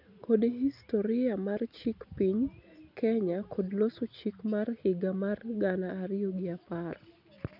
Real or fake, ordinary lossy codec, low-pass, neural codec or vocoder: real; none; 5.4 kHz; none